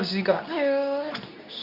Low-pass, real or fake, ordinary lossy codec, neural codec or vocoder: 5.4 kHz; fake; none; codec, 24 kHz, 0.9 kbps, WavTokenizer, medium speech release version 2